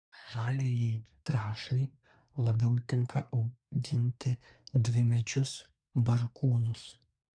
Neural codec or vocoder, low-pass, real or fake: codec, 24 kHz, 1 kbps, SNAC; 9.9 kHz; fake